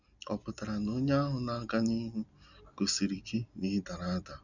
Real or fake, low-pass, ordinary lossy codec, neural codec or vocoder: real; 7.2 kHz; none; none